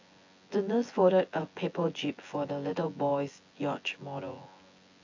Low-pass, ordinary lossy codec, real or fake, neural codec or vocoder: 7.2 kHz; AAC, 48 kbps; fake; vocoder, 24 kHz, 100 mel bands, Vocos